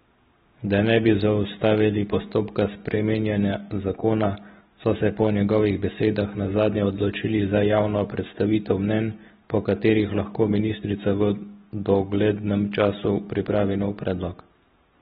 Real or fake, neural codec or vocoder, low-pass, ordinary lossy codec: real; none; 7.2 kHz; AAC, 16 kbps